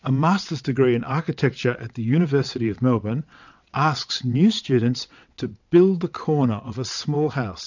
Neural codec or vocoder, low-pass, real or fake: vocoder, 22.05 kHz, 80 mel bands, WaveNeXt; 7.2 kHz; fake